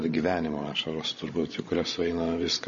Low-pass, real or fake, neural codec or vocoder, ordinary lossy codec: 7.2 kHz; fake; codec, 16 kHz, 16 kbps, FreqCodec, larger model; MP3, 32 kbps